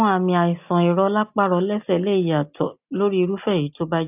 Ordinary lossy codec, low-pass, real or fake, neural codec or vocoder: AAC, 32 kbps; 3.6 kHz; real; none